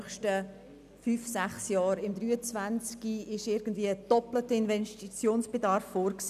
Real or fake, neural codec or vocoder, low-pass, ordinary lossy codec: real; none; 14.4 kHz; none